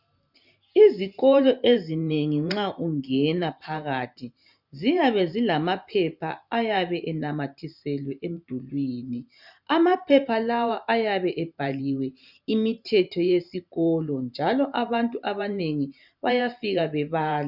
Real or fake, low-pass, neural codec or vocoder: fake; 5.4 kHz; vocoder, 44.1 kHz, 128 mel bands every 512 samples, BigVGAN v2